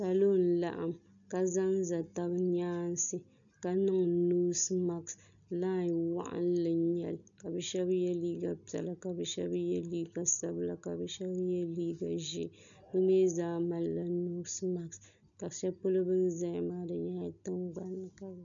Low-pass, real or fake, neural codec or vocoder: 7.2 kHz; real; none